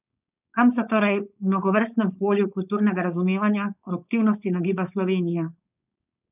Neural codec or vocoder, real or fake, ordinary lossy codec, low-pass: codec, 16 kHz, 4.8 kbps, FACodec; fake; none; 3.6 kHz